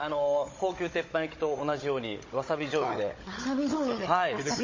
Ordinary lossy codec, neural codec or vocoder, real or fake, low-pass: MP3, 32 kbps; codec, 16 kHz, 16 kbps, FunCodec, trained on Chinese and English, 50 frames a second; fake; 7.2 kHz